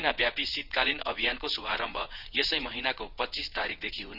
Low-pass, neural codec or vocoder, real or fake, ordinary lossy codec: 5.4 kHz; vocoder, 22.05 kHz, 80 mel bands, WaveNeXt; fake; none